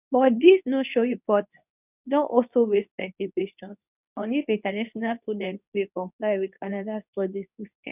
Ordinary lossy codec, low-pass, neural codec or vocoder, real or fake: none; 3.6 kHz; codec, 24 kHz, 0.9 kbps, WavTokenizer, medium speech release version 2; fake